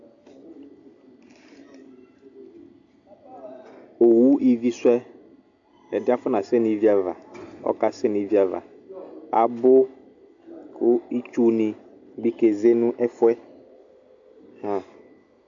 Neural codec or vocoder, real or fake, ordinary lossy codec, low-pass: none; real; AAC, 64 kbps; 7.2 kHz